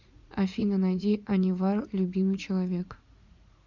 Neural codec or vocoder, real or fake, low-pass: vocoder, 44.1 kHz, 80 mel bands, Vocos; fake; 7.2 kHz